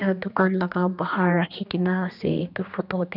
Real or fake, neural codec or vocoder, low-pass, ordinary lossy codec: fake; codec, 16 kHz, 2 kbps, X-Codec, HuBERT features, trained on general audio; 5.4 kHz; none